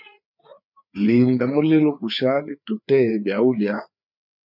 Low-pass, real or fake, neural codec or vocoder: 5.4 kHz; fake; codec, 16 kHz, 4 kbps, FreqCodec, larger model